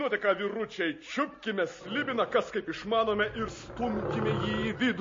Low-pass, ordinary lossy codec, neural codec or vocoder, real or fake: 7.2 kHz; MP3, 32 kbps; none; real